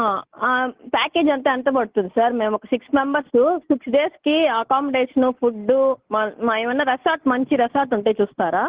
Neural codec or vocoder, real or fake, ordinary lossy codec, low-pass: none; real; Opus, 16 kbps; 3.6 kHz